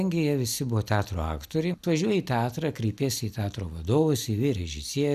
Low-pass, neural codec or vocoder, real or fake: 14.4 kHz; none; real